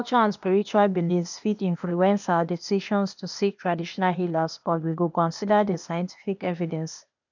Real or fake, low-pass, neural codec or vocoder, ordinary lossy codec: fake; 7.2 kHz; codec, 16 kHz, 0.8 kbps, ZipCodec; none